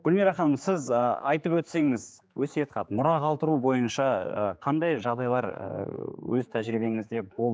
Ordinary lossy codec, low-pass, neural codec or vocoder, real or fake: none; none; codec, 16 kHz, 4 kbps, X-Codec, HuBERT features, trained on general audio; fake